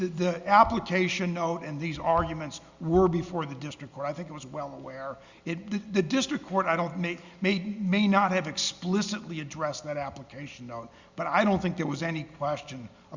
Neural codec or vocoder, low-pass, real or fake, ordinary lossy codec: none; 7.2 kHz; real; Opus, 64 kbps